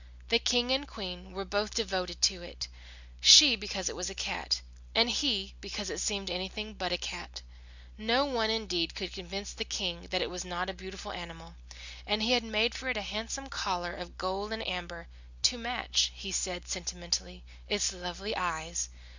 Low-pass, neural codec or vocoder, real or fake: 7.2 kHz; none; real